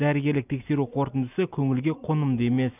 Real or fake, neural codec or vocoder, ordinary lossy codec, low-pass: real; none; none; 3.6 kHz